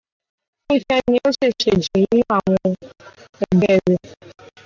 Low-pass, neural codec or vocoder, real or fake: 7.2 kHz; none; real